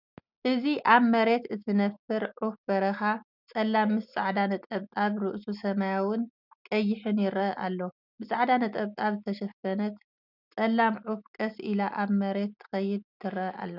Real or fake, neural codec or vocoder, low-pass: real; none; 5.4 kHz